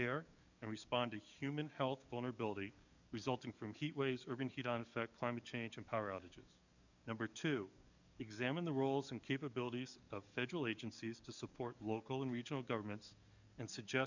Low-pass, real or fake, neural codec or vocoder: 7.2 kHz; fake; codec, 44.1 kHz, 7.8 kbps, DAC